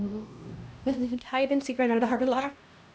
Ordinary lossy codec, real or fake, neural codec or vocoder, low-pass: none; fake; codec, 16 kHz, 1 kbps, X-Codec, HuBERT features, trained on LibriSpeech; none